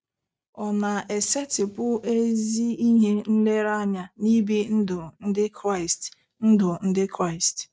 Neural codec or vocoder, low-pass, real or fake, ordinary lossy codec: none; none; real; none